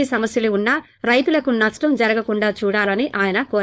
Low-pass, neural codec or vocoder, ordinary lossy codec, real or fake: none; codec, 16 kHz, 4.8 kbps, FACodec; none; fake